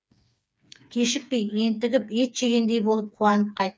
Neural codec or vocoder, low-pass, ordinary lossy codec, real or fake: codec, 16 kHz, 4 kbps, FreqCodec, smaller model; none; none; fake